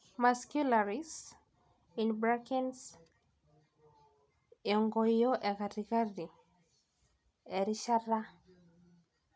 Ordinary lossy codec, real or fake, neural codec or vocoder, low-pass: none; real; none; none